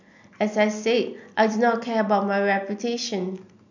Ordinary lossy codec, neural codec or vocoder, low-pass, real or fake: none; none; 7.2 kHz; real